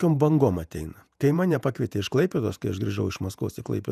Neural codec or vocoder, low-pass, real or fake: vocoder, 48 kHz, 128 mel bands, Vocos; 14.4 kHz; fake